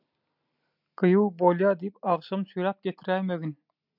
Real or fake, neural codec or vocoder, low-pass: real; none; 5.4 kHz